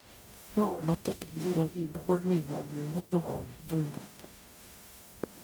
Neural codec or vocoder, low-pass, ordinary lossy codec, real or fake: codec, 44.1 kHz, 0.9 kbps, DAC; none; none; fake